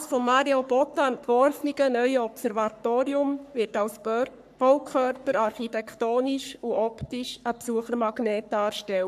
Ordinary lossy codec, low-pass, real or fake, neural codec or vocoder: none; 14.4 kHz; fake; codec, 44.1 kHz, 3.4 kbps, Pupu-Codec